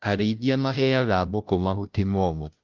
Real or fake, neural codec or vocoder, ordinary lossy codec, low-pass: fake; codec, 16 kHz, 0.5 kbps, FunCodec, trained on Chinese and English, 25 frames a second; Opus, 32 kbps; 7.2 kHz